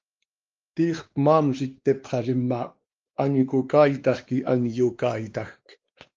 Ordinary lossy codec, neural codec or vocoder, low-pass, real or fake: Opus, 24 kbps; codec, 16 kHz, 2 kbps, X-Codec, WavLM features, trained on Multilingual LibriSpeech; 7.2 kHz; fake